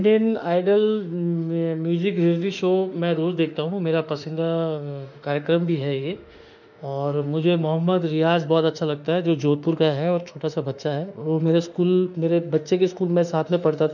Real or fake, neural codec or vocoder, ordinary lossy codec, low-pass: fake; autoencoder, 48 kHz, 32 numbers a frame, DAC-VAE, trained on Japanese speech; none; 7.2 kHz